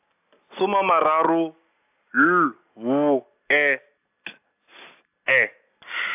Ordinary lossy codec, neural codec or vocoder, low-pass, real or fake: AAC, 32 kbps; none; 3.6 kHz; real